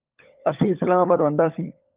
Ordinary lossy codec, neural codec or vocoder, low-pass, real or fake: Opus, 24 kbps; codec, 16 kHz, 16 kbps, FunCodec, trained on LibriTTS, 50 frames a second; 3.6 kHz; fake